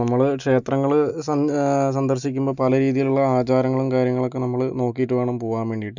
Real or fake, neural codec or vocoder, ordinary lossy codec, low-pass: real; none; none; 7.2 kHz